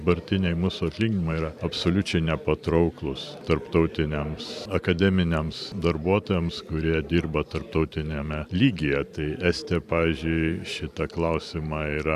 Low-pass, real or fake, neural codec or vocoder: 14.4 kHz; fake; vocoder, 44.1 kHz, 128 mel bands every 512 samples, BigVGAN v2